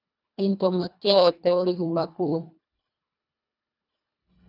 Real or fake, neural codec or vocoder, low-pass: fake; codec, 24 kHz, 1.5 kbps, HILCodec; 5.4 kHz